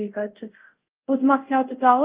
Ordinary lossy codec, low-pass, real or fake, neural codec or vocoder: Opus, 32 kbps; 3.6 kHz; fake; codec, 24 kHz, 0.5 kbps, DualCodec